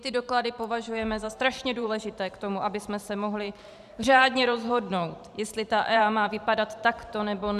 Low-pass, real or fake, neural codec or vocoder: 14.4 kHz; fake; vocoder, 44.1 kHz, 128 mel bands every 512 samples, BigVGAN v2